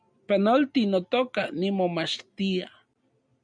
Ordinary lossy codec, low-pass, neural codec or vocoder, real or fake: MP3, 96 kbps; 9.9 kHz; none; real